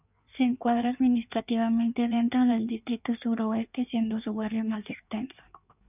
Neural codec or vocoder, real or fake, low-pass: codec, 16 kHz in and 24 kHz out, 1.1 kbps, FireRedTTS-2 codec; fake; 3.6 kHz